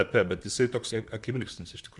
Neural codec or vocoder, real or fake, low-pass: vocoder, 44.1 kHz, 128 mel bands, Pupu-Vocoder; fake; 10.8 kHz